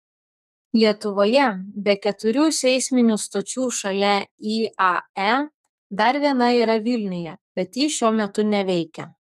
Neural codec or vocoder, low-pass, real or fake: codec, 44.1 kHz, 2.6 kbps, SNAC; 14.4 kHz; fake